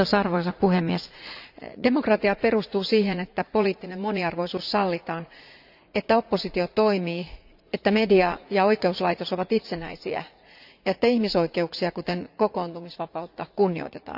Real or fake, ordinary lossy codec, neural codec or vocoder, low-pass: fake; none; vocoder, 44.1 kHz, 80 mel bands, Vocos; 5.4 kHz